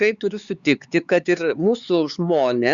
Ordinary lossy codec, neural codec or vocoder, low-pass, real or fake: Opus, 64 kbps; codec, 16 kHz, 4 kbps, X-Codec, HuBERT features, trained on LibriSpeech; 7.2 kHz; fake